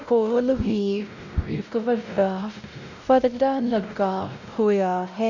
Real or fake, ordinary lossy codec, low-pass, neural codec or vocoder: fake; none; 7.2 kHz; codec, 16 kHz, 0.5 kbps, X-Codec, WavLM features, trained on Multilingual LibriSpeech